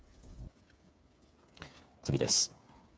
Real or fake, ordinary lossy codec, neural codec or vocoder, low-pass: fake; none; codec, 16 kHz, 8 kbps, FreqCodec, smaller model; none